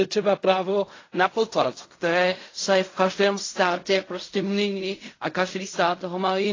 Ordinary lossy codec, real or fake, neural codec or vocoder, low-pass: AAC, 32 kbps; fake; codec, 16 kHz in and 24 kHz out, 0.4 kbps, LongCat-Audio-Codec, fine tuned four codebook decoder; 7.2 kHz